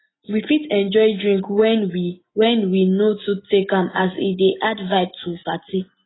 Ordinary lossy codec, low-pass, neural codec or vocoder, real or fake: AAC, 16 kbps; 7.2 kHz; none; real